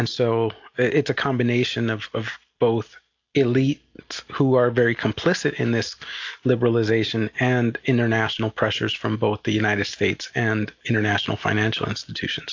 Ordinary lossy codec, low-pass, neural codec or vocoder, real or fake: AAC, 48 kbps; 7.2 kHz; none; real